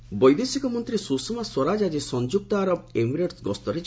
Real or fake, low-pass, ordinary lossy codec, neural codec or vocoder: real; none; none; none